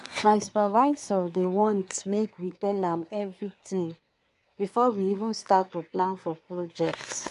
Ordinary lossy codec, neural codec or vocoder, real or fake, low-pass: none; codec, 24 kHz, 1 kbps, SNAC; fake; 10.8 kHz